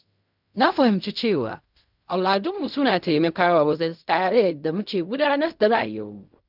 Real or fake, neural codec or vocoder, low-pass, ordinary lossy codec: fake; codec, 16 kHz in and 24 kHz out, 0.4 kbps, LongCat-Audio-Codec, fine tuned four codebook decoder; 5.4 kHz; none